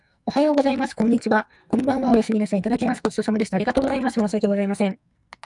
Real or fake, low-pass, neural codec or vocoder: fake; 10.8 kHz; codec, 44.1 kHz, 2.6 kbps, SNAC